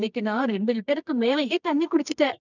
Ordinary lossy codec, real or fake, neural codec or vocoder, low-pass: none; fake; codec, 24 kHz, 0.9 kbps, WavTokenizer, medium music audio release; 7.2 kHz